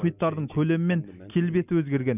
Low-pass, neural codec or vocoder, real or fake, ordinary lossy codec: 3.6 kHz; none; real; none